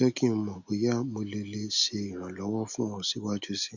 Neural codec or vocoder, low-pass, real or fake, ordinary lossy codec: none; 7.2 kHz; real; none